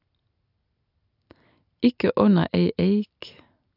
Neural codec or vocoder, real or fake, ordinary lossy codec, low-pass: none; real; AAC, 48 kbps; 5.4 kHz